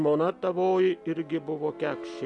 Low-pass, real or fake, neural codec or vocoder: 10.8 kHz; real; none